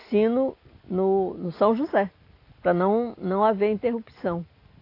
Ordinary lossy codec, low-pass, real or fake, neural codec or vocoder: AAC, 32 kbps; 5.4 kHz; real; none